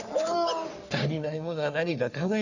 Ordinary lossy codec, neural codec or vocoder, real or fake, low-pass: none; codec, 44.1 kHz, 3.4 kbps, Pupu-Codec; fake; 7.2 kHz